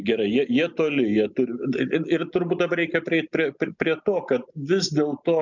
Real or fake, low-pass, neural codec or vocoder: real; 7.2 kHz; none